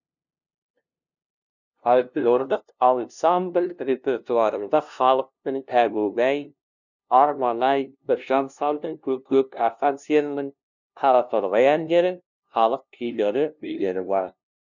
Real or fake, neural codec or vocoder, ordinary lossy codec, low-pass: fake; codec, 16 kHz, 0.5 kbps, FunCodec, trained on LibriTTS, 25 frames a second; none; 7.2 kHz